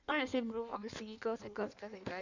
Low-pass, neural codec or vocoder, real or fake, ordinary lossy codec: 7.2 kHz; codec, 16 kHz in and 24 kHz out, 1.1 kbps, FireRedTTS-2 codec; fake; none